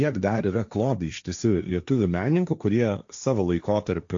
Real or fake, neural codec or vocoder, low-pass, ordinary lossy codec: fake; codec, 16 kHz, 1.1 kbps, Voila-Tokenizer; 7.2 kHz; AAC, 64 kbps